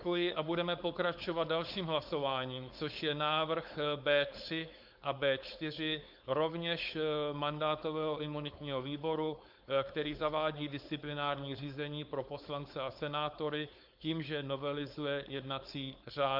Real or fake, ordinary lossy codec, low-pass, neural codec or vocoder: fake; Opus, 64 kbps; 5.4 kHz; codec, 16 kHz, 4.8 kbps, FACodec